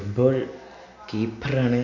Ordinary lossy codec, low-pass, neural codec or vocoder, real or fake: none; 7.2 kHz; none; real